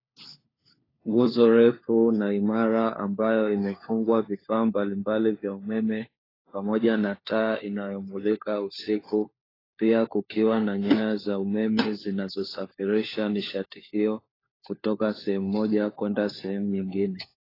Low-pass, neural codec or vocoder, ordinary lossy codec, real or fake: 5.4 kHz; codec, 16 kHz, 4 kbps, FunCodec, trained on LibriTTS, 50 frames a second; AAC, 24 kbps; fake